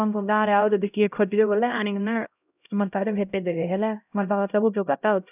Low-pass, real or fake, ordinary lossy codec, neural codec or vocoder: 3.6 kHz; fake; none; codec, 16 kHz, 0.5 kbps, X-Codec, HuBERT features, trained on LibriSpeech